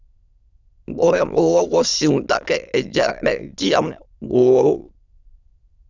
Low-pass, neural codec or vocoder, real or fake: 7.2 kHz; autoencoder, 22.05 kHz, a latent of 192 numbers a frame, VITS, trained on many speakers; fake